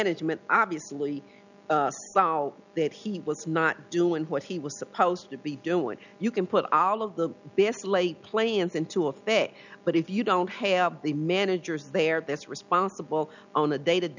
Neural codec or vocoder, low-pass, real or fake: none; 7.2 kHz; real